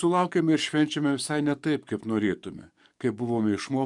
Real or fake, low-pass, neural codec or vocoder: fake; 10.8 kHz; codec, 44.1 kHz, 7.8 kbps, DAC